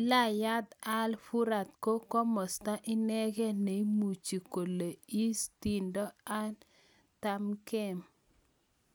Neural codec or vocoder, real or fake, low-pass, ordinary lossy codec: none; real; none; none